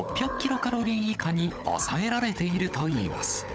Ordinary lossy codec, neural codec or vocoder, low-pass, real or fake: none; codec, 16 kHz, 16 kbps, FunCodec, trained on LibriTTS, 50 frames a second; none; fake